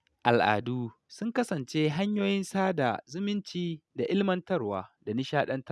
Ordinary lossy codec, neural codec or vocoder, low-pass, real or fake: none; none; none; real